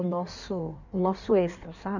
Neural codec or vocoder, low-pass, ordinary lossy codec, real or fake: codec, 16 kHz in and 24 kHz out, 1.1 kbps, FireRedTTS-2 codec; 7.2 kHz; none; fake